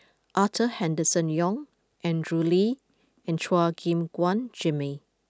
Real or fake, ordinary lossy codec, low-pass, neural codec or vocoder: real; none; none; none